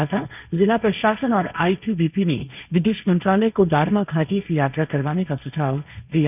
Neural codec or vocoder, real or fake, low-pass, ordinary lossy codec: codec, 16 kHz, 1.1 kbps, Voila-Tokenizer; fake; 3.6 kHz; none